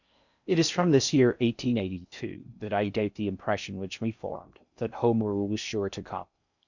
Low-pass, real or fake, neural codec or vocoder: 7.2 kHz; fake; codec, 16 kHz in and 24 kHz out, 0.6 kbps, FocalCodec, streaming, 4096 codes